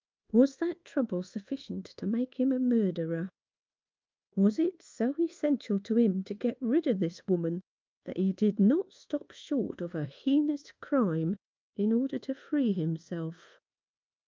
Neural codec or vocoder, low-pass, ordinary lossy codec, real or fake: codec, 24 kHz, 1.2 kbps, DualCodec; 7.2 kHz; Opus, 32 kbps; fake